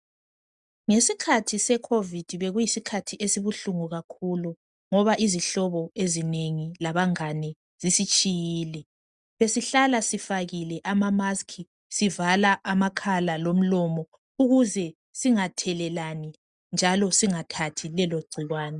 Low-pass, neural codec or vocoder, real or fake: 10.8 kHz; none; real